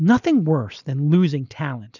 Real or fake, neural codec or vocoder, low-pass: real; none; 7.2 kHz